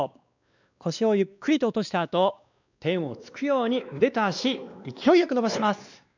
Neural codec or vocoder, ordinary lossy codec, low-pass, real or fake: codec, 16 kHz, 2 kbps, X-Codec, WavLM features, trained on Multilingual LibriSpeech; none; 7.2 kHz; fake